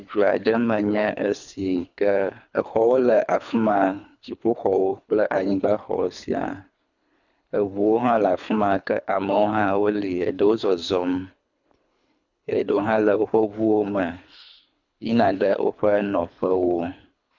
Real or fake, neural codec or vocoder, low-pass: fake; codec, 24 kHz, 3 kbps, HILCodec; 7.2 kHz